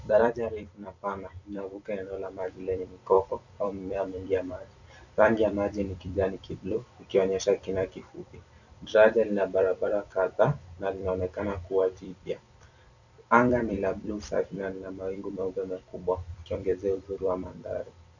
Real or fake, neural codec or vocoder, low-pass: fake; vocoder, 44.1 kHz, 128 mel bands every 256 samples, BigVGAN v2; 7.2 kHz